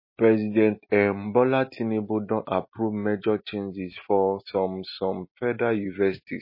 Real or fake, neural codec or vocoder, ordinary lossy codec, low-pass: real; none; MP3, 24 kbps; 5.4 kHz